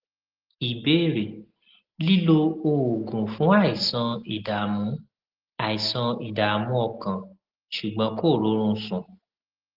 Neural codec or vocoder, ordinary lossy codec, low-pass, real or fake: none; Opus, 16 kbps; 5.4 kHz; real